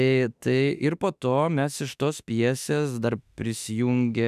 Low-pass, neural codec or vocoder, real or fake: 14.4 kHz; autoencoder, 48 kHz, 32 numbers a frame, DAC-VAE, trained on Japanese speech; fake